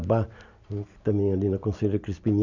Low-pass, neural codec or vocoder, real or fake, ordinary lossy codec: 7.2 kHz; none; real; none